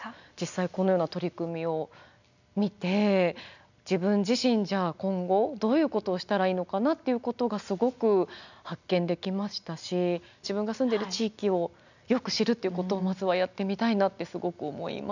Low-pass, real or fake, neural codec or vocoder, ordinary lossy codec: 7.2 kHz; real; none; none